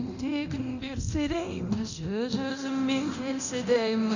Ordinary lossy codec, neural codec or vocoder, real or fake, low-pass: none; codec, 24 kHz, 0.9 kbps, DualCodec; fake; 7.2 kHz